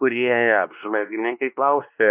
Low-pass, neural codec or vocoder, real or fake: 3.6 kHz; codec, 16 kHz, 2 kbps, X-Codec, WavLM features, trained on Multilingual LibriSpeech; fake